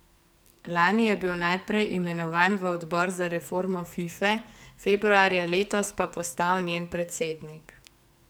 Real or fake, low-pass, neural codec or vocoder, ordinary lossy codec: fake; none; codec, 44.1 kHz, 2.6 kbps, SNAC; none